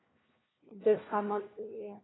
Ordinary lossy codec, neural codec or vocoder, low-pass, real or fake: AAC, 16 kbps; codec, 16 kHz, 1 kbps, FunCodec, trained on LibriTTS, 50 frames a second; 7.2 kHz; fake